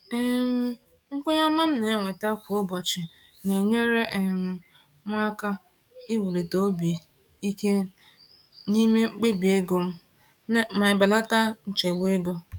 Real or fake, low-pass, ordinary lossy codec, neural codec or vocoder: fake; 19.8 kHz; none; codec, 44.1 kHz, 7.8 kbps, DAC